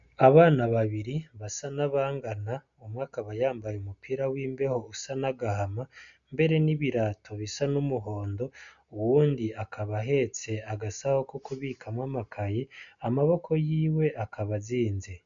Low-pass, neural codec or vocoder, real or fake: 7.2 kHz; none; real